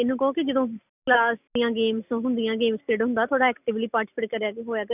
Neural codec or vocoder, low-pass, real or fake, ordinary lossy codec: none; 3.6 kHz; real; none